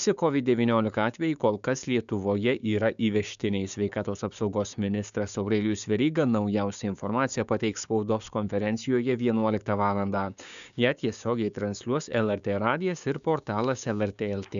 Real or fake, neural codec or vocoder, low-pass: fake; codec, 16 kHz, 6 kbps, DAC; 7.2 kHz